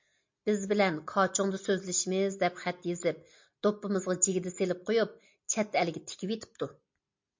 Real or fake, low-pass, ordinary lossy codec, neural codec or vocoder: real; 7.2 kHz; MP3, 48 kbps; none